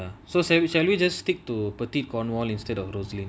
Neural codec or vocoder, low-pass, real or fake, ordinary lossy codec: none; none; real; none